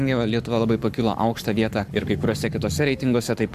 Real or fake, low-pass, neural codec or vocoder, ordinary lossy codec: fake; 14.4 kHz; codec, 44.1 kHz, 7.8 kbps, Pupu-Codec; AAC, 96 kbps